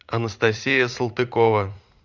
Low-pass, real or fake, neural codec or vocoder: 7.2 kHz; fake; vocoder, 44.1 kHz, 80 mel bands, Vocos